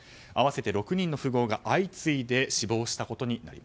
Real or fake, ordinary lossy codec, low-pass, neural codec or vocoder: real; none; none; none